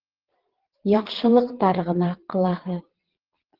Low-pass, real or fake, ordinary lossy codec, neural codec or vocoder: 5.4 kHz; fake; Opus, 16 kbps; vocoder, 22.05 kHz, 80 mel bands, Vocos